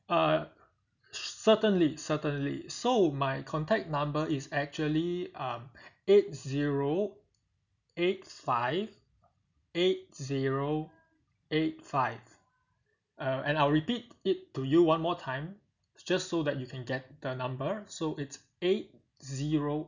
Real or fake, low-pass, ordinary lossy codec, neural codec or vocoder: real; 7.2 kHz; none; none